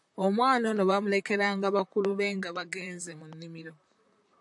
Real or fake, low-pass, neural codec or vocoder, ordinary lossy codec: fake; 10.8 kHz; vocoder, 44.1 kHz, 128 mel bands, Pupu-Vocoder; AAC, 64 kbps